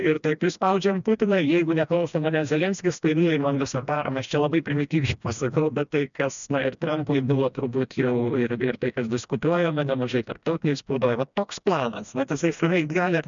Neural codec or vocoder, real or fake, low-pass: codec, 16 kHz, 1 kbps, FreqCodec, smaller model; fake; 7.2 kHz